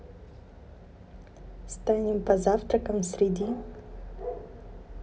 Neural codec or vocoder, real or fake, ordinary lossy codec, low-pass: none; real; none; none